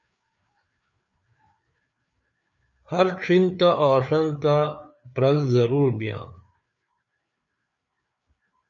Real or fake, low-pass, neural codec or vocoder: fake; 7.2 kHz; codec, 16 kHz, 4 kbps, FreqCodec, larger model